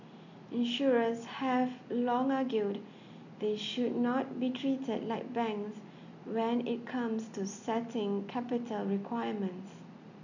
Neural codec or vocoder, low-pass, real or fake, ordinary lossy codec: none; 7.2 kHz; real; none